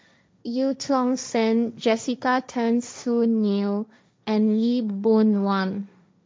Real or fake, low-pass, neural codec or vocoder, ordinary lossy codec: fake; none; codec, 16 kHz, 1.1 kbps, Voila-Tokenizer; none